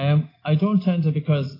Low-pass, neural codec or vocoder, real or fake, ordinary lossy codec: 5.4 kHz; none; real; AAC, 24 kbps